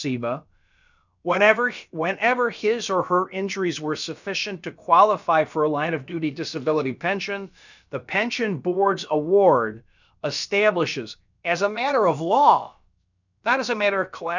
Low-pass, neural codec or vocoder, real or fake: 7.2 kHz; codec, 16 kHz, about 1 kbps, DyCAST, with the encoder's durations; fake